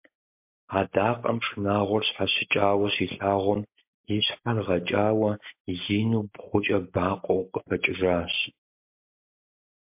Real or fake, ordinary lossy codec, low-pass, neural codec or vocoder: real; MP3, 32 kbps; 3.6 kHz; none